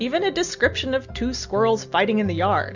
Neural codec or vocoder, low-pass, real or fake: none; 7.2 kHz; real